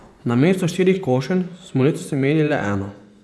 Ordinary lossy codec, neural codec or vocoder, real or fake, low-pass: none; vocoder, 24 kHz, 100 mel bands, Vocos; fake; none